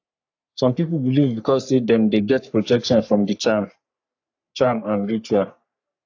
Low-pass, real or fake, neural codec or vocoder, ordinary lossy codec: 7.2 kHz; fake; codec, 44.1 kHz, 3.4 kbps, Pupu-Codec; AAC, 48 kbps